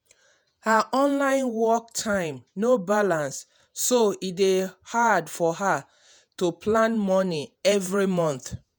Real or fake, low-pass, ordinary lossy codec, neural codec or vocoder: fake; none; none; vocoder, 48 kHz, 128 mel bands, Vocos